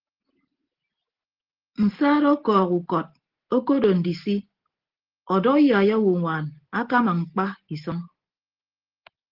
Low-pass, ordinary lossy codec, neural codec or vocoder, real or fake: 5.4 kHz; Opus, 16 kbps; none; real